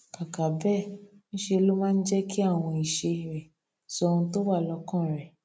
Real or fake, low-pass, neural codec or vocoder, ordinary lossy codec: real; none; none; none